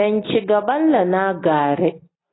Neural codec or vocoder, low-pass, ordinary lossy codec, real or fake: none; 7.2 kHz; AAC, 16 kbps; real